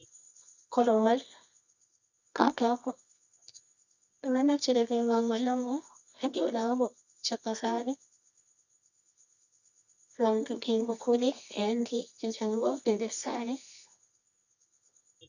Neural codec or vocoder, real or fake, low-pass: codec, 24 kHz, 0.9 kbps, WavTokenizer, medium music audio release; fake; 7.2 kHz